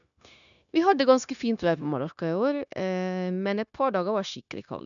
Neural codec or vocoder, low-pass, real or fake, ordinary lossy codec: codec, 16 kHz, 0.9 kbps, LongCat-Audio-Codec; 7.2 kHz; fake; none